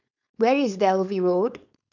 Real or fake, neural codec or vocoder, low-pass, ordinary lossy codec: fake; codec, 16 kHz, 4.8 kbps, FACodec; 7.2 kHz; none